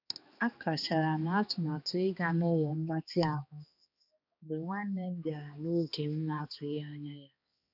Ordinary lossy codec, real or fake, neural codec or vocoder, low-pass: none; fake; codec, 16 kHz, 2 kbps, X-Codec, HuBERT features, trained on balanced general audio; 5.4 kHz